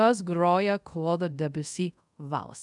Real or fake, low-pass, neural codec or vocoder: fake; 10.8 kHz; codec, 24 kHz, 0.5 kbps, DualCodec